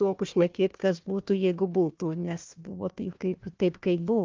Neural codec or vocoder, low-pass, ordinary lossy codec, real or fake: codec, 16 kHz, 1 kbps, FunCodec, trained on Chinese and English, 50 frames a second; 7.2 kHz; Opus, 24 kbps; fake